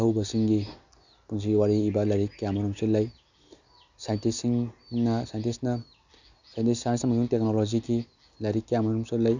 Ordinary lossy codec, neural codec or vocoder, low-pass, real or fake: none; none; 7.2 kHz; real